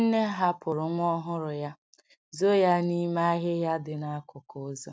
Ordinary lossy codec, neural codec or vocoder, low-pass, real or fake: none; none; none; real